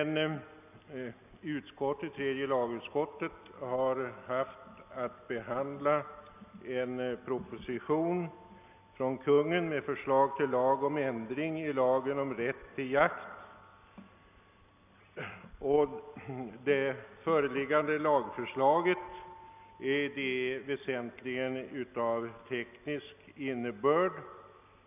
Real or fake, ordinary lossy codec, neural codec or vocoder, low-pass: real; none; none; 3.6 kHz